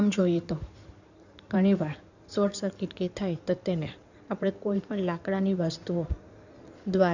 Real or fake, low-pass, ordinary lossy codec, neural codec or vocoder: fake; 7.2 kHz; none; codec, 16 kHz in and 24 kHz out, 2.2 kbps, FireRedTTS-2 codec